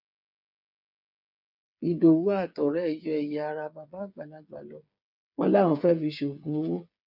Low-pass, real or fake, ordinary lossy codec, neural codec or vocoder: 5.4 kHz; fake; none; codec, 16 kHz, 8 kbps, FreqCodec, smaller model